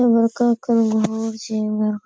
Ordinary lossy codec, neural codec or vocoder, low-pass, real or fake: none; none; none; real